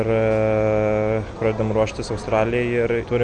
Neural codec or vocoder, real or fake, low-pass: none; real; 9.9 kHz